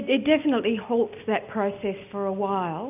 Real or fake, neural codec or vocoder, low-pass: real; none; 3.6 kHz